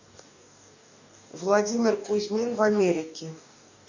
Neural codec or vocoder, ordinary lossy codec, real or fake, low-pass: codec, 44.1 kHz, 2.6 kbps, DAC; none; fake; 7.2 kHz